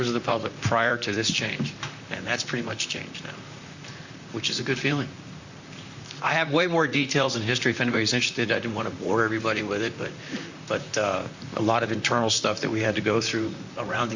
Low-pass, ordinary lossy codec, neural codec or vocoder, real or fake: 7.2 kHz; Opus, 64 kbps; vocoder, 44.1 kHz, 128 mel bands, Pupu-Vocoder; fake